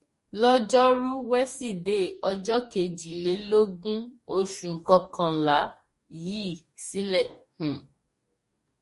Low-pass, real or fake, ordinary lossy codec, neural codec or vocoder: 14.4 kHz; fake; MP3, 48 kbps; codec, 44.1 kHz, 2.6 kbps, DAC